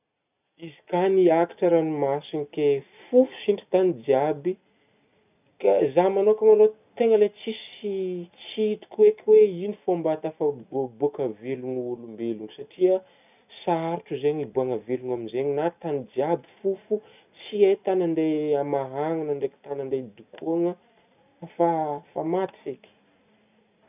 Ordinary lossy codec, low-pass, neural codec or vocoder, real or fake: none; 3.6 kHz; none; real